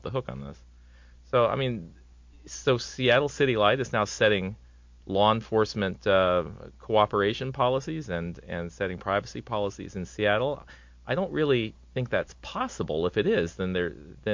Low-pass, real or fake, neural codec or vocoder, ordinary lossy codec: 7.2 kHz; real; none; MP3, 48 kbps